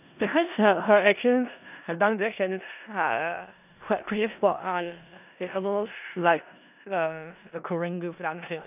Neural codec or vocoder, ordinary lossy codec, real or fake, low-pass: codec, 16 kHz in and 24 kHz out, 0.4 kbps, LongCat-Audio-Codec, four codebook decoder; none; fake; 3.6 kHz